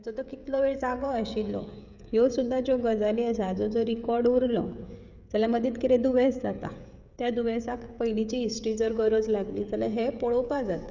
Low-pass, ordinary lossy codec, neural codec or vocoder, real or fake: 7.2 kHz; none; codec, 16 kHz, 16 kbps, FreqCodec, smaller model; fake